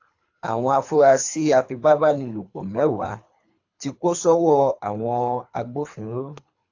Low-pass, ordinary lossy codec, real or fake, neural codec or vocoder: 7.2 kHz; AAC, 48 kbps; fake; codec, 24 kHz, 3 kbps, HILCodec